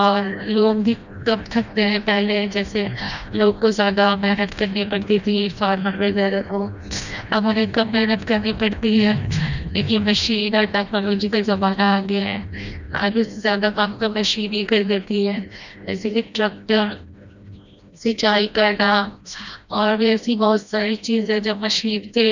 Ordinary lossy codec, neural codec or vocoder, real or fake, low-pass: none; codec, 16 kHz, 1 kbps, FreqCodec, smaller model; fake; 7.2 kHz